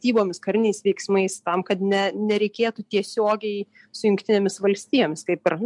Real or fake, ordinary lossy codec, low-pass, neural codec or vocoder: real; MP3, 64 kbps; 10.8 kHz; none